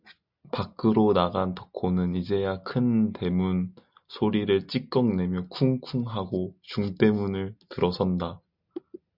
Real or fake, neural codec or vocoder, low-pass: real; none; 5.4 kHz